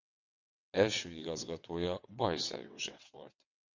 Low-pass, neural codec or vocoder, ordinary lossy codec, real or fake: 7.2 kHz; vocoder, 22.05 kHz, 80 mel bands, WaveNeXt; MP3, 48 kbps; fake